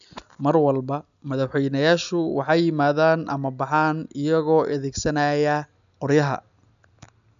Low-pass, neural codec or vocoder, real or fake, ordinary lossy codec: 7.2 kHz; none; real; none